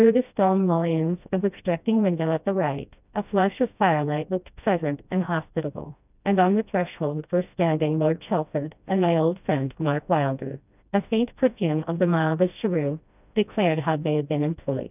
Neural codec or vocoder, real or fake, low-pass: codec, 16 kHz, 1 kbps, FreqCodec, smaller model; fake; 3.6 kHz